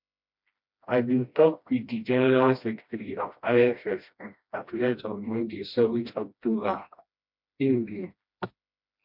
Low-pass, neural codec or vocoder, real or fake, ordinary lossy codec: 5.4 kHz; codec, 16 kHz, 1 kbps, FreqCodec, smaller model; fake; MP3, 48 kbps